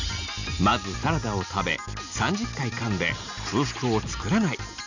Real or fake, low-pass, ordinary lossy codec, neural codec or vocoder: real; 7.2 kHz; none; none